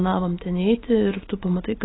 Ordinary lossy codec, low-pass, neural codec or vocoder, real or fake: AAC, 16 kbps; 7.2 kHz; none; real